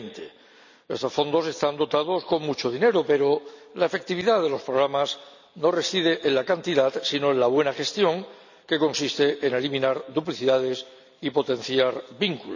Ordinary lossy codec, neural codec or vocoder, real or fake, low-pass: none; none; real; 7.2 kHz